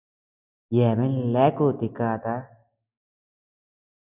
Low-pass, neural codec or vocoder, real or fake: 3.6 kHz; none; real